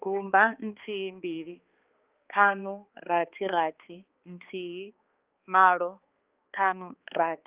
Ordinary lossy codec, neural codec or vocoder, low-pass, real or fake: Opus, 24 kbps; codec, 16 kHz, 2 kbps, X-Codec, HuBERT features, trained on balanced general audio; 3.6 kHz; fake